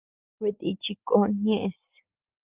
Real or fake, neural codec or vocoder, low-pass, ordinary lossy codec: real; none; 3.6 kHz; Opus, 24 kbps